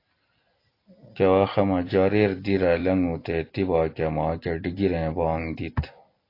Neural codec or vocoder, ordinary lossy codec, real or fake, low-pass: none; AAC, 32 kbps; real; 5.4 kHz